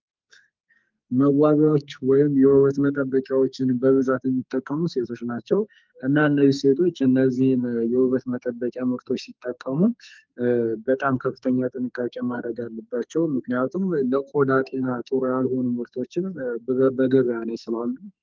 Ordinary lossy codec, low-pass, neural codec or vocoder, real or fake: Opus, 24 kbps; 7.2 kHz; codec, 44.1 kHz, 2.6 kbps, SNAC; fake